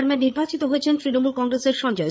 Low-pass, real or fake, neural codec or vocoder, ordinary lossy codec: none; fake; codec, 16 kHz, 8 kbps, FreqCodec, larger model; none